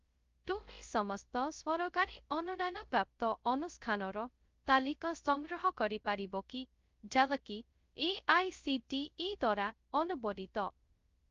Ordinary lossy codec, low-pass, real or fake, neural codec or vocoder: Opus, 32 kbps; 7.2 kHz; fake; codec, 16 kHz, 0.2 kbps, FocalCodec